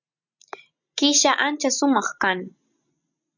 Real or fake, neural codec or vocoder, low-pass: real; none; 7.2 kHz